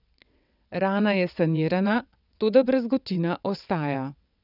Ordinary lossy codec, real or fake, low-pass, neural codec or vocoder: none; fake; 5.4 kHz; vocoder, 22.05 kHz, 80 mel bands, WaveNeXt